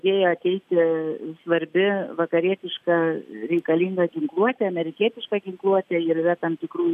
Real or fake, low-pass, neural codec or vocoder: real; 14.4 kHz; none